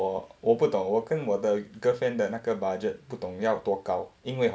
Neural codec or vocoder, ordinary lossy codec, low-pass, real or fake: none; none; none; real